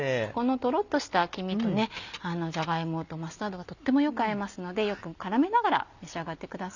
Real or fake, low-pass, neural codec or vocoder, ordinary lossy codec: real; 7.2 kHz; none; none